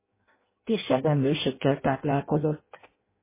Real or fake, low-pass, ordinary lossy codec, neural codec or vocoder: fake; 3.6 kHz; MP3, 16 kbps; codec, 16 kHz in and 24 kHz out, 0.6 kbps, FireRedTTS-2 codec